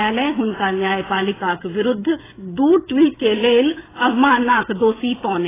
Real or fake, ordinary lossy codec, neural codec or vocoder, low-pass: fake; AAC, 16 kbps; codec, 16 kHz, 16 kbps, FreqCodec, smaller model; 3.6 kHz